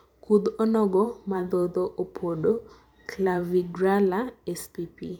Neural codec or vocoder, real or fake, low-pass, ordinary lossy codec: vocoder, 44.1 kHz, 128 mel bands every 512 samples, BigVGAN v2; fake; 19.8 kHz; none